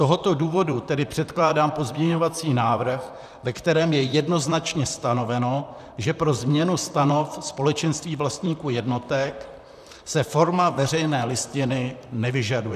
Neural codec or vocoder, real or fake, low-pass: vocoder, 44.1 kHz, 128 mel bands, Pupu-Vocoder; fake; 14.4 kHz